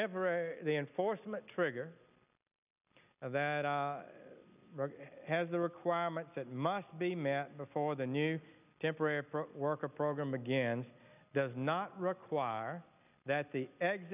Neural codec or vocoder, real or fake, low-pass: none; real; 3.6 kHz